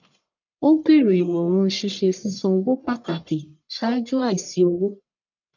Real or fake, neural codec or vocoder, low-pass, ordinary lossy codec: fake; codec, 44.1 kHz, 1.7 kbps, Pupu-Codec; 7.2 kHz; none